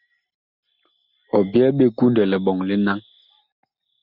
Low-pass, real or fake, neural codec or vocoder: 5.4 kHz; real; none